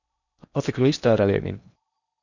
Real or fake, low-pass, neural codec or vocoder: fake; 7.2 kHz; codec, 16 kHz in and 24 kHz out, 0.8 kbps, FocalCodec, streaming, 65536 codes